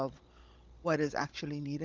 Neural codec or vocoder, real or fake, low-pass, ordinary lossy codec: codec, 16 kHz, 16 kbps, FunCodec, trained on Chinese and English, 50 frames a second; fake; 7.2 kHz; Opus, 24 kbps